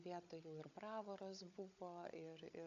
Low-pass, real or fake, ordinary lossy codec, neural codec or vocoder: 7.2 kHz; fake; AAC, 64 kbps; codec, 16 kHz, 16 kbps, FunCodec, trained on Chinese and English, 50 frames a second